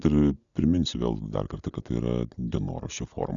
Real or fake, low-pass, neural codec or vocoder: real; 7.2 kHz; none